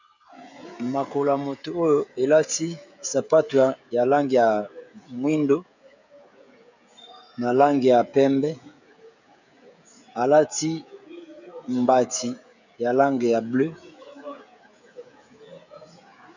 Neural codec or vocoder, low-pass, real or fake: codec, 16 kHz, 16 kbps, FreqCodec, smaller model; 7.2 kHz; fake